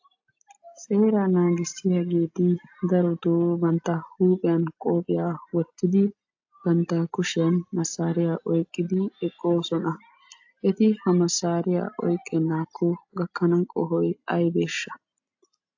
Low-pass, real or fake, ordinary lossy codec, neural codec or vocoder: 7.2 kHz; real; AAC, 48 kbps; none